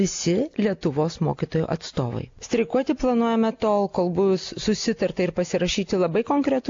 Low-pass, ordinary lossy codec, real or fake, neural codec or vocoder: 7.2 kHz; AAC, 48 kbps; real; none